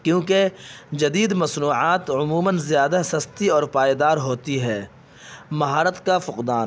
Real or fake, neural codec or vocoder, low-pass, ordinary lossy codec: real; none; none; none